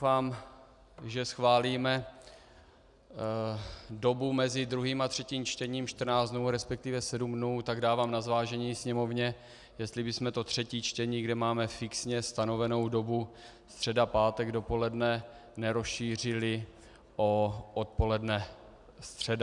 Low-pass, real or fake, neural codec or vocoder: 10.8 kHz; real; none